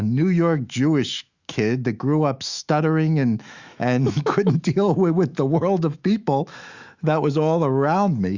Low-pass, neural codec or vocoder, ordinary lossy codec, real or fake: 7.2 kHz; autoencoder, 48 kHz, 128 numbers a frame, DAC-VAE, trained on Japanese speech; Opus, 64 kbps; fake